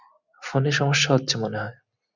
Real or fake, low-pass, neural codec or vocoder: real; 7.2 kHz; none